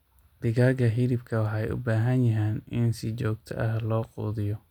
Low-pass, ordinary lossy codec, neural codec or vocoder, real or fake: 19.8 kHz; none; none; real